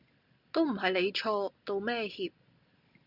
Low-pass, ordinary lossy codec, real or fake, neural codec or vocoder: 5.4 kHz; Opus, 64 kbps; real; none